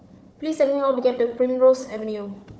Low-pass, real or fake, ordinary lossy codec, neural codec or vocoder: none; fake; none; codec, 16 kHz, 4 kbps, FunCodec, trained on Chinese and English, 50 frames a second